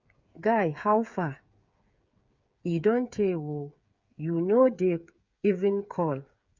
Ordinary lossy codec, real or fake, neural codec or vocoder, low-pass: Opus, 64 kbps; fake; codec, 16 kHz, 8 kbps, FreqCodec, smaller model; 7.2 kHz